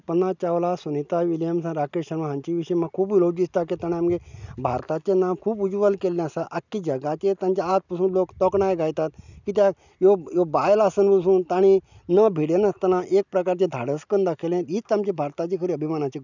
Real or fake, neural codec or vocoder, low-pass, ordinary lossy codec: real; none; 7.2 kHz; none